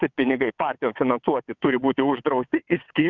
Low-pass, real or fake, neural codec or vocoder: 7.2 kHz; fake; vocoder, 22.05 kHz, 80 mel bands, WaveNeXt